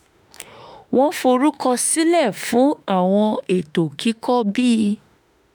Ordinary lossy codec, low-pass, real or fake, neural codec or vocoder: none; none; fake; autoencoder, 48 kHz, 32 numbers a frame, DAC-VAE, trained on Japanese speech